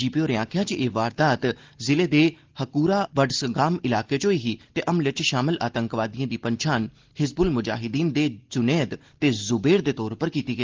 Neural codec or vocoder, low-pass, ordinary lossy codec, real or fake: none; 7.2 kHz; Opus, 16 kbps; real